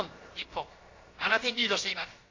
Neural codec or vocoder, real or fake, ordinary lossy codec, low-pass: codec, 16 kHz, about 1 kbps, DyCAST, with the encoder's durations; fake; AAC, 32 kbps; 7.2 kHz